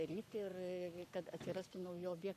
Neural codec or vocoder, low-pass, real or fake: codec, 44.1 kHz, 7.8 kbps, Pupu-Codec; 14.4 kHz; fake